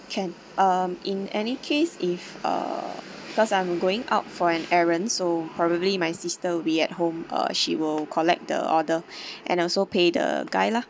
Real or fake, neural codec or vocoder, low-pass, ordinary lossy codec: real; none; none; none